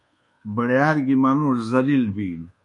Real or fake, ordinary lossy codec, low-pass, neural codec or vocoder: fake; MP3, 48 kbps; 10.8 kHz; codec, 24 kHz, 1.2 kbps, DualCodec